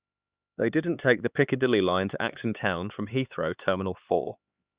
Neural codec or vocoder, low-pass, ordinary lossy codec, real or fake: codec, 16 kHz, 4 kbps, X-Codec, HuBERT features, trained on LibriSpeech; 3.6 kHz; Opus, 64 kbps; fake